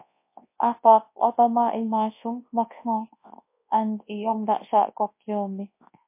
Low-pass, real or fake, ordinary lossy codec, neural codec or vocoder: 3.6 kHz; fake; MP3, 24 kbps; codec, 24 kHz, 0.9 kbps, WavTokenizer, large speech release